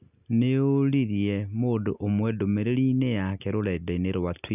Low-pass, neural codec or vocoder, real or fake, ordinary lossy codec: 3.6 kHz; none; real; none